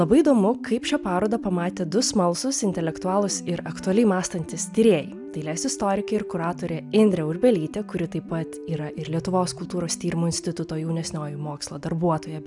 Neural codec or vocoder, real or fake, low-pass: none; real; 10.8 kHz